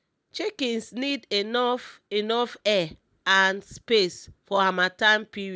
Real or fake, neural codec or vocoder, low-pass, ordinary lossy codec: real; none; none; none